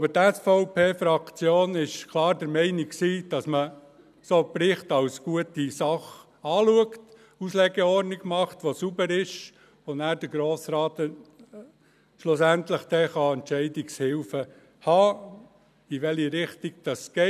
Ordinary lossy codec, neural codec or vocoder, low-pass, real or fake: none; none; 14.4 kHz; real